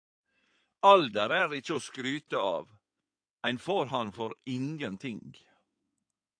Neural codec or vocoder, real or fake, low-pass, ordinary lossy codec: codec, 16 kHz in and 24 kHz out, 2.2 kbps, FireRedTTS-2 codec; fake; 9.9 kHz; AAC, 64 kbps